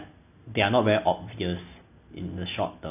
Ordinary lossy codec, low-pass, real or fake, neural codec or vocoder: none; 3.6 kHz; real; none